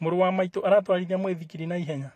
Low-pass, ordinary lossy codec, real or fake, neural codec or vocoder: 14.4 kHz; AAC, 48 kbps; fake; vocoder, 44.1 kHz, 128 mel bands every 512 samples, BigVGAN v2